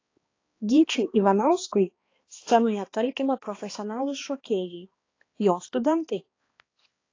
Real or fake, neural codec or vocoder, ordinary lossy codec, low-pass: fake; codec, 16 kHz, 2 kbps, X-Codec, HuBERT features, trained on balanced general audio; AAC, 32 kbps; 7.2 kHz